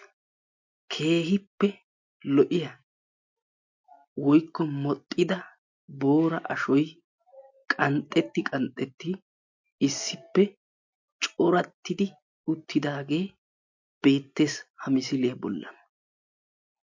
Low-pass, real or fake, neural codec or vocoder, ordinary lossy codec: 7.2 kHz; real; none; MP3, 48 kbps